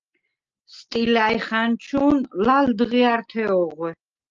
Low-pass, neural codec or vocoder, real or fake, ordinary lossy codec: 7.2 kHz; none; real; Opus, 24 kbps